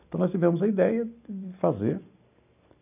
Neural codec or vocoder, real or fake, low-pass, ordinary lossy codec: none; real; 3.6 kHz; none